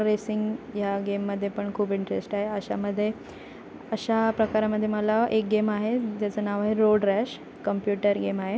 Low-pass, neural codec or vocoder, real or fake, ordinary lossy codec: none; none; real; none